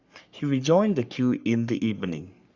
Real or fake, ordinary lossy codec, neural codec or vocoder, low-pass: fake; Opus, 64 kbps; codec, 44.1 kHz, 3.4 kbps, Pupu-Codec; 7.2 kHz